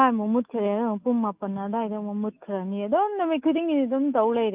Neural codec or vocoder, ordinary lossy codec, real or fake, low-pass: autoencoder, 48 kHz, 128 numbers a frame, DAC-VAE, trained on Japanese speech; Opus, 24 kbps; fake; 3.6 kHz